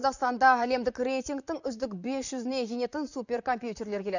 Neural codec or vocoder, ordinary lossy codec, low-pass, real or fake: vocoder, 44.1 kHz, 128 mel bands, Pupu-Vocoder; none; 7.2 kHz; fake